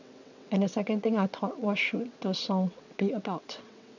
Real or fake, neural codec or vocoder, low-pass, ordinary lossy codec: fake; vocoder, 22.05 kHz, 80 mel bands, WaveNeXt; 7.2 kHz; none